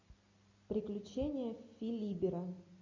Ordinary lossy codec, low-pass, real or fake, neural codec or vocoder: MP3, 32 kbps; 7.2 kHz; real; none